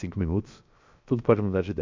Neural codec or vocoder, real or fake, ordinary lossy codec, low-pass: codec, 16 kHz, 0.7 kbps, FocalCodec; fake; MP3, 64 kbps; 7.2 kHz